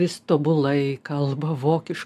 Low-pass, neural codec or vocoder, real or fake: 14.4 kHz; none; real